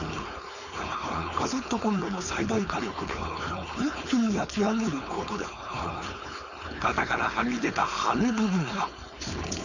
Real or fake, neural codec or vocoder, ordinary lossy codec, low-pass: fake; codec, 16 kHz, 4.8 kbps, FACodec; none; 7.2 kHz